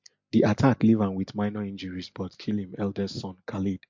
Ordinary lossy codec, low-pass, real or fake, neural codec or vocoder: MP3, 48 kbps; 7.2 kHz; real; none